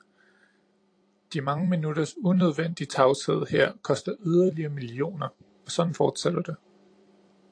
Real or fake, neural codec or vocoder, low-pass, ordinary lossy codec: fake; vocoder, 44.1 kHz, 128 mel bands every 256 samples, BigVGAN v2; 9.9 kHz; AAC, 48 kbps